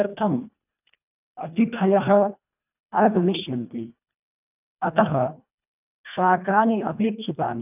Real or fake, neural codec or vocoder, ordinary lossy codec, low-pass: fake; codec, 24 kHz, 1.5 kbps, HILCodec; none; 3.6 kHz